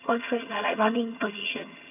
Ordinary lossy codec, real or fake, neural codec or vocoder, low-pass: none; fake; vocoder, 22.05 kHz, 80 mel bands, HiFi-GAN; 3.6 kHz